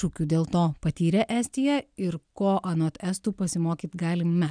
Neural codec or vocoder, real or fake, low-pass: none; real; 9.9 kHz